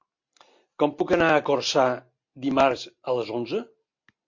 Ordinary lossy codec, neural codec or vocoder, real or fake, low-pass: MP3, 48 kbps; none; real; 7.2 kHz